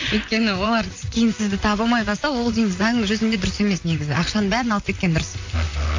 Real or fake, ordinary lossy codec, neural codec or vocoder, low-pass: fake; AAC, 48 kbps; vocoder, 44.1 kHz, 128 mel bands, Pupu-Vocoder; 7.2 kHz